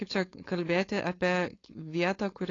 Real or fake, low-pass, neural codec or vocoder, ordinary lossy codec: fake; 7.2 kHz; codec, 16 kHz, 4.8 kbps, FACodec; AAC, 32 kbps